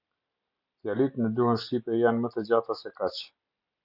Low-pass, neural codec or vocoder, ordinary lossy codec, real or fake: 5.4 kHz; none; Opus, 64 kbps; real